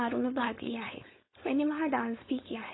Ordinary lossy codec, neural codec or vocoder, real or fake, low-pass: AAC, 16 kbps; codec, 16 kHz, 4.8 kbps, FACodec; fake; 7.2 kHz